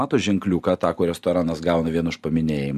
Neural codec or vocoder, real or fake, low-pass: none; real; 14.4 kHz